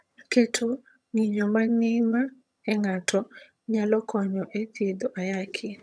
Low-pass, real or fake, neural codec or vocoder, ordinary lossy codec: none; fake; vocoder, 22.05 kHz, 80 mel bands, HiFi-GAN; none